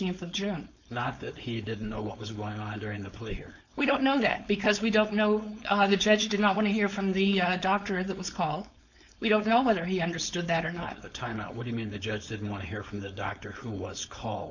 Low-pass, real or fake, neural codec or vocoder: 7.2 kHz; fake; codec, 16 kHz, 4.8 kbps, FACodec